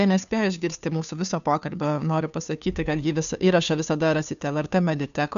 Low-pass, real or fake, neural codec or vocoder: 7.2 kHz; fake; codec, 16 kHz, 2 kbps, FunCodec, trained on Chinese and English, 25 frames a second